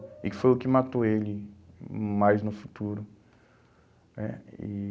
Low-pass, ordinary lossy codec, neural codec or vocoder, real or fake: none; none; none; real